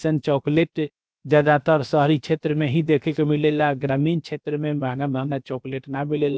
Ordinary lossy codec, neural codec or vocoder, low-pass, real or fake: none; codec, 16 kHz, about 1 kbps, DyCAST, with the encoder's durations; none; fake